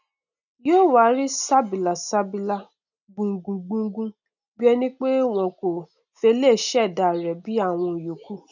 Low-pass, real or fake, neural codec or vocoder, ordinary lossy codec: 7.2 kHz; real; none; none